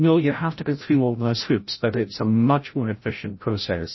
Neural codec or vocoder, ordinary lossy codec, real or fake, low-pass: codec, 16 kHz, 0.5 kbps, FreqCodec, larger model; MP3, 24 kbps; fake; 7.2 kHz